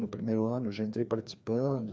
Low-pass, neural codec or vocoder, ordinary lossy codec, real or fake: none; codec, 16 kHz, 2 kbps, FreqCodec, larger model; none; fake